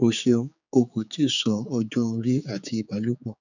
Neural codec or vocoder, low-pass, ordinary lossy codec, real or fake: codec, 16 kHz, 4 kbps, X-Codec, HuBERT features, trained on general audio; 7.2 kHz; none; fake